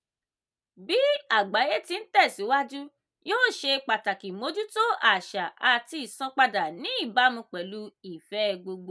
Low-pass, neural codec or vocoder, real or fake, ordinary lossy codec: none; none; real; none